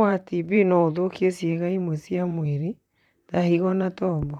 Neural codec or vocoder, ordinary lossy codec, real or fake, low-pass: vocoder, 44.1 kHz, 128 mel bands, Pupu-Vocoder; none; fake; 19.8 kHz